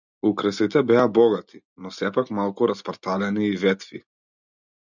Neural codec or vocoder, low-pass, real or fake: none; 7.2 kHz; real